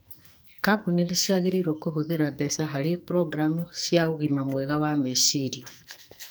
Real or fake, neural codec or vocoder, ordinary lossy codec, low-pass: fake; codec, 44.1 kHz, 2.6 kbps, SNAC; none; none